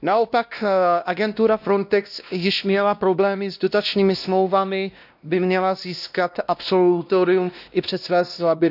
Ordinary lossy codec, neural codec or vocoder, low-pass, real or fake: none; codec, 16 kHz, 1 kbps, X-Codec, WavLM features, trained on Multilingual LibriSpeech; 5.4 kHz; fake